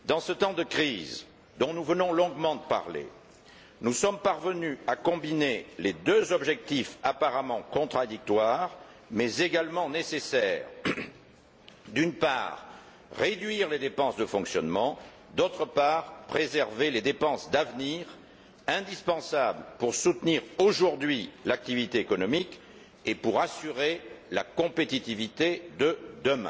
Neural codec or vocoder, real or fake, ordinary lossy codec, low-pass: none; real; none; none